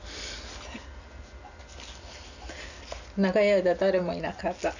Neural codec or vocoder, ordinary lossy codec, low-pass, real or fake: none; none; 7.2 kHz; real